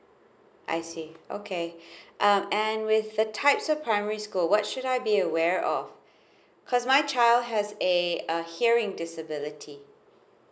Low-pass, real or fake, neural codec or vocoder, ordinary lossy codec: none; real; none; none